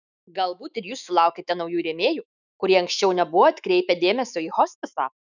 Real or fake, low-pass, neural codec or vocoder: fake; 7.2 kHz; autoencoder, 48 kHz, 128 numbers a frame, DAC-VAE, trained on Japanese speech